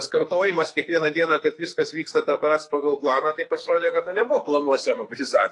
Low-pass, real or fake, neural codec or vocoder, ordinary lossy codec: 10.8 kHz; fake; codec, 44.1 kHz, 2.6 kbps, SNAC; AAC, 48 kbps